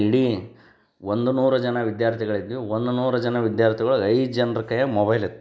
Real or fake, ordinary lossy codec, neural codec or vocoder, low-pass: real; none; none; none